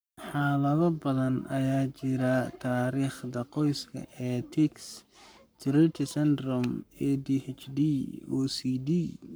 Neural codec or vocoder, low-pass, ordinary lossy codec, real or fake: codec, 44.1 kHz, 7.8 kbps, Pupu-Codec; none; none; fake